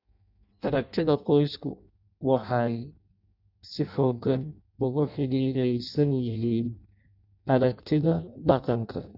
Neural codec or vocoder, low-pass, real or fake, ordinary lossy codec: codec, 16 kHz in and 24 kHz out, 0.6 kbps, FireRedTTS-2 codec; 5.4 kHz; fake; none